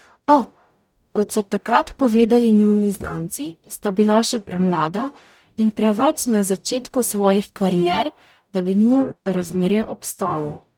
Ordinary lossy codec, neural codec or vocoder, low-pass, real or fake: none; codec, 44.1 kHz, 0.9 kbps, DAC; 19.8 kHz; fake